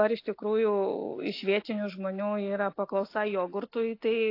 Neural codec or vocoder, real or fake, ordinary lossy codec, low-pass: none; real; AAC, 32 kbps; 5.4 kHz